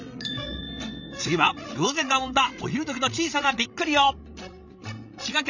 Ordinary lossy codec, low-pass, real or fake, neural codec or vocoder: none; 7.2 kHz; fake; codec, 16 kHz, 16 kbps, FreqCodec, larger model